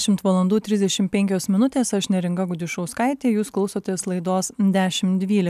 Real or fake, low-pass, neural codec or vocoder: real; 14.4 kHz; none